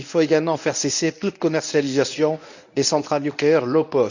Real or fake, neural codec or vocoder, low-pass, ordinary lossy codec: fake; codec, 24 kHz, 0.9 kbps, WavTokenizer, medium speech release version 1; 7.2 kHz; none